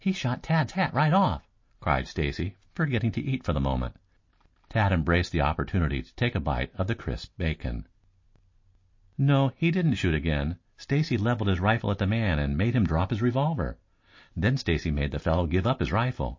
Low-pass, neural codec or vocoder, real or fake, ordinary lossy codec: 7.2 kHz; none; real; MP3, 32 kbps